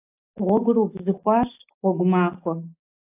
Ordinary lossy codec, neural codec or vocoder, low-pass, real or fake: AAC, 32 kbps; none; 3.6 kHz; real